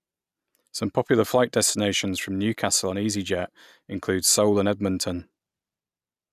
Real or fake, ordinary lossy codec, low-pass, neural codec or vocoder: real; none; 14.4 kHz; none